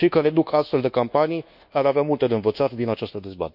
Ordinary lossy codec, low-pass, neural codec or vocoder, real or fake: none; 5.4 kHz; codec, 24 kHz, 1.2 kbps, DualCodec; fake